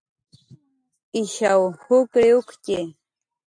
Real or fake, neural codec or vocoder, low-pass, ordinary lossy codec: real; none; 9.9 kHz; AAC, 64 kbps